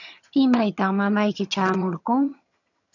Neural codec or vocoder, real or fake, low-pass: vocoder, 22.05 kHz, 80 mel bands, HiFi-GAN; fake; 7.2 kHz